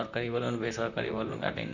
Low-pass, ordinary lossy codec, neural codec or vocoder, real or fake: 7.2 kHz; none; vocoder, 44.1 kHz, 128 mel bands, Pupu-Vocoder; fake